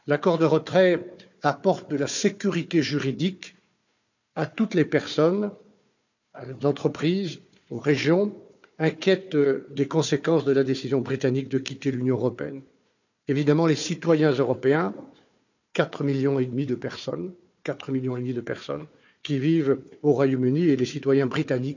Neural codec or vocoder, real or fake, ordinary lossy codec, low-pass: codec, 16 kHz, 4 kbps, FunCodec, trained on Chinese and English, 50 frames a second; fake; none; 7.2 kHz